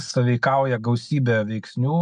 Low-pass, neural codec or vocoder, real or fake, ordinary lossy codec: 9.9 kHz; none; real; MP3, 64 kbps